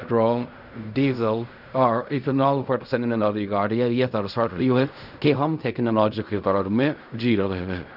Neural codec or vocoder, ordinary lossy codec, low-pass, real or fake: codec, 16 kHz in and 24 kHz out, 0.4 kbps, LongCat-Audio-Codec, fine tuned four codebook decoder; none; 5.4 kHz; fake